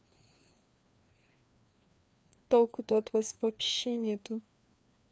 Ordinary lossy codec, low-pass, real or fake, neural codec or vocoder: none; none; fake; codec, 16 kHz, 2 kbps, FreqCodec, larger model